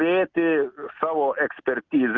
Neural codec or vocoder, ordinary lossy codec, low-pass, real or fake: none; Opus, 24 kbps; 7.2 kHz; real